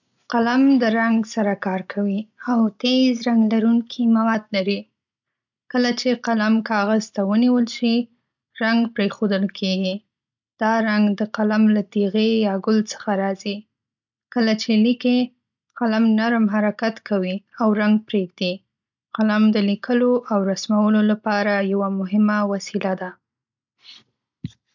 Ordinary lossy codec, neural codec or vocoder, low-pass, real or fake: none; none; 7.2 kHz; real